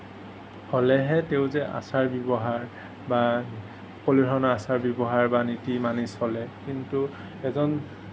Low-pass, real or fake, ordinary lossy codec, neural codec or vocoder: none; real; none; none